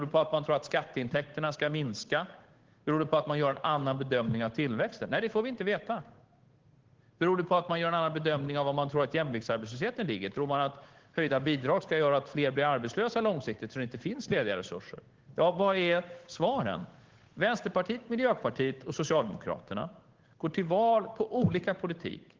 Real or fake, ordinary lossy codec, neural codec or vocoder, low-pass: fake; Opus, 16 kbps; codec, 16 kHz, 8 kbps, FunCodec, trained on Chinese and English, 25 frames a second; 7.2 kHz